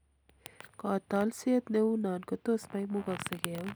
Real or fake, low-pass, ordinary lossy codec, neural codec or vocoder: real; none; none; none